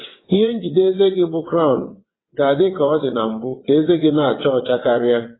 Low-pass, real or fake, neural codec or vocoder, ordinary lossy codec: 7.2 kHz; fake; vocoder, 22.05 kHz, 80 mel bands, WaveNeXt; AAC, 16 kbps